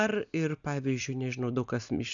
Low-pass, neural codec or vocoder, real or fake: 7.2 kHz; none; real